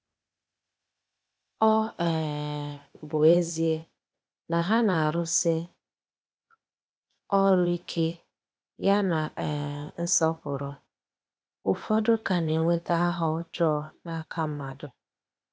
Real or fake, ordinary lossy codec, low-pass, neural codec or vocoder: fake; none; none; codec, 16 kHz, 0.8 kbps, ZipCodec